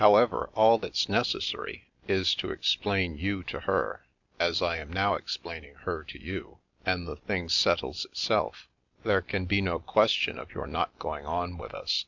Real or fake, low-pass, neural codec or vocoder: real; 7.2 kHz; none